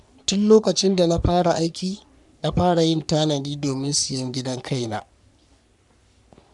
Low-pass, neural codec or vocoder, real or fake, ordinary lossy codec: 10.8 kHz; codec, 44.1 kHz, 3.4 kbps, Pupu-Codec; fake; none